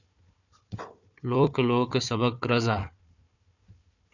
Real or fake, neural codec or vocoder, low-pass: fake; codec, 16 kHz, 4 kbps, FunCodec, trained on Chinese and English, 50 frames a second; 7.2 kHz